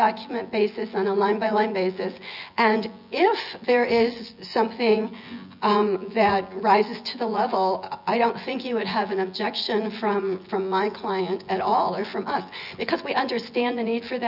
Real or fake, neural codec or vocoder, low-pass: fake; vocoder, 24 kHz, 100 mel bands, Vocos; 5.4 kHz